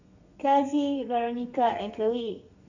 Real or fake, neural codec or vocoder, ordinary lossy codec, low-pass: fake; codec, 32 kHz, 1.9 kbps, SNAC; none; 7.2 kHz